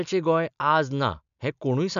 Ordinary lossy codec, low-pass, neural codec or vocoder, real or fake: none; 7.2 kHz; none; real